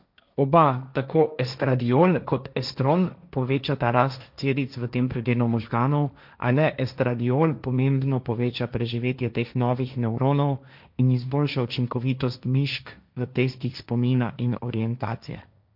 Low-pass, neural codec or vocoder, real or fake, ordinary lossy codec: 5.4 kHz; codec, 16 kHz, 1.1 kbps, Voila-Tokenizer; fake; none